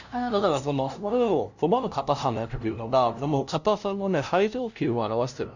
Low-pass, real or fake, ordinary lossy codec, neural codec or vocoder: 7.2 kHz; fake; none; codec, 16 kHz, 0.5 kbps, FunCodec, trained on LibriTTS, 25 frames a second